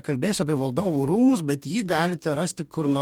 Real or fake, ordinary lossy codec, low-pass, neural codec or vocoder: fake; MP3, 96 kbps; 19.8 kHz; codec, 44.1 kHz, 2.6 kbps, DAC